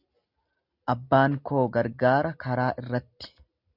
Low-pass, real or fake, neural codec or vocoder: 5.4 kHz; real; none